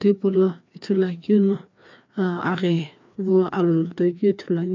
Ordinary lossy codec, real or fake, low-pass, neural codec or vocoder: MP3, 64 kbps; fake; 7.2 kHz; codec, 16 kHz, 2 kbps, FreqCodec, larger model